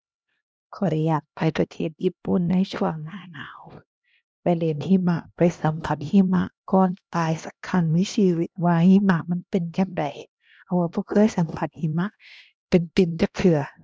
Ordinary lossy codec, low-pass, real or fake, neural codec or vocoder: none; none; fake; codec, 16 kHz, 1 kbps, X-Codec, HuBERT features, trained on LibriSpeech